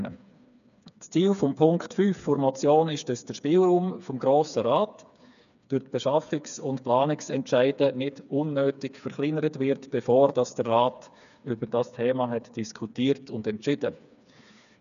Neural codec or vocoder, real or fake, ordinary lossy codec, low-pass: codec, 16 kHz, 4 kbps, FreqCodec, smaller model; fake; none; 7.2 kHz